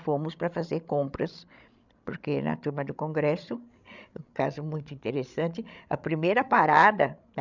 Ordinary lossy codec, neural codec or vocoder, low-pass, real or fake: none; codec, 16 kHz, 16 kbps, FreqCodec, larger model; 7.2 kHz; fake